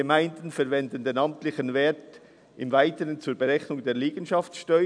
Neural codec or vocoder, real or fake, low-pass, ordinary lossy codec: none; real; 9.9 kHz; none